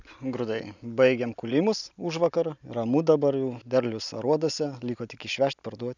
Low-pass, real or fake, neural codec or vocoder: 7.2 kHz; real; none